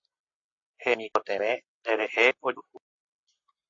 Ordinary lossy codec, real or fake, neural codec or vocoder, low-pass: AAC, 48 kbps; real; none; 7.2 kHz